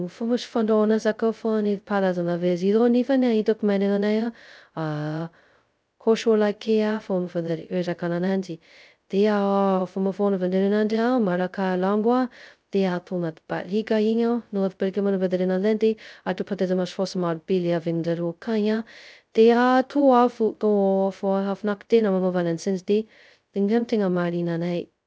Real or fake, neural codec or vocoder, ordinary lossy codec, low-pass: fake; codec, 16 kHz, 0.2 kbps, FocalCodec; none; none